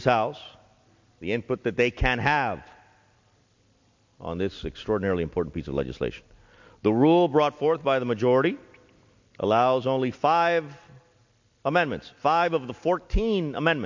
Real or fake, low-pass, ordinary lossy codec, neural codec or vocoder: real; 7.2 kHz; MP3, 48 kbps; none